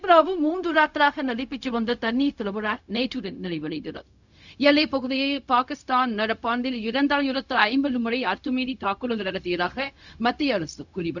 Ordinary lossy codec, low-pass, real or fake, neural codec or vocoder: none; 7.2 kHz; fake; codec, 16 kHz, 0.4 kbps, LongCat-Audio-Codec